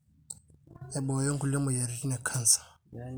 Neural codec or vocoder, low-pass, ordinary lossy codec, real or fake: none; none; none; real